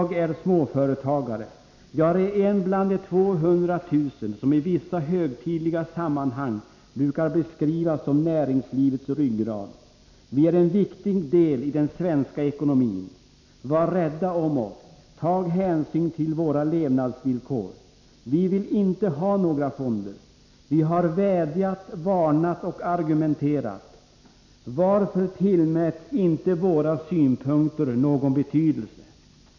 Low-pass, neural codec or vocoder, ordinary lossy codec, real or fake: 7.2 kHz; none; none; real